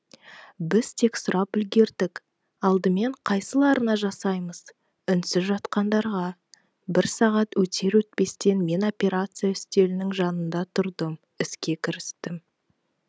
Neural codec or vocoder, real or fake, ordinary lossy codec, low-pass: none; real; none; none